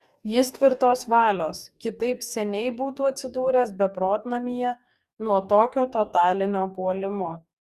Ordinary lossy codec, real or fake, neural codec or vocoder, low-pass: Opus, 64 kbps; fake; codec, 44.1 kHz, 2.6 kbps, DAC; 14.4 kHz